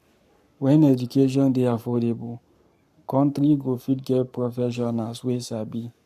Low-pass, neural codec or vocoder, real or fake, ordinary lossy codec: 14.4 kHz; codec, 44.1 kHz, 7.8 kbps, Pupu-Codec; fake; none